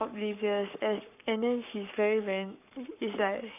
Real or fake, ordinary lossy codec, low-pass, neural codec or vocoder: fake; none; 3.6 kHz; codec, 44.1 kHz, 7.8 kbps, Pupu-Codec